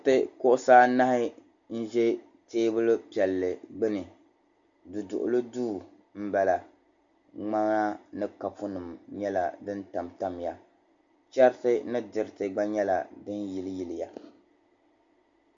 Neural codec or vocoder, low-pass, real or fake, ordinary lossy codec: none; 7.2 kHz; real; MP3, 64 kbps